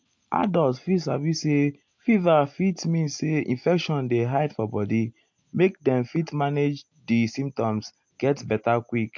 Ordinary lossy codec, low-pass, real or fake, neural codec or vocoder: MP3, 48 kbps; 7.2 kHz; real; none